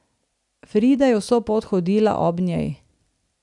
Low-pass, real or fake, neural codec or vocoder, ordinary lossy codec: 10.8 kHz; real; none; none